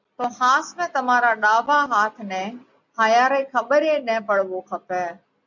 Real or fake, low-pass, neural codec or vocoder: real; 7.2 kHz; none